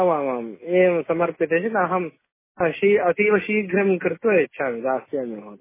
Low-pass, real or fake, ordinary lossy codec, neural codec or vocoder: 3.6 kHz; real; MP3, 16 kbps; none